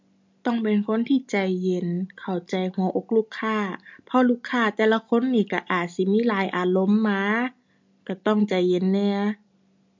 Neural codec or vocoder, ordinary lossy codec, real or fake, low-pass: none; MP3, 48 kbps; real; 7.2 kHz